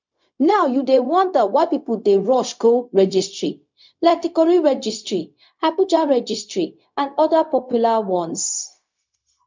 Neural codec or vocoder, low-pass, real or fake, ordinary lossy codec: codec, 16 kHz, 0.4 kbps, LongCat-Audio-Codec; 7.2 kHz; fake; MP3, 64 kbps